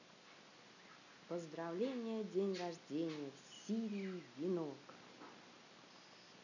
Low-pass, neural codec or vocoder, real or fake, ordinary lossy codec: 7.2 kHz; none; real; none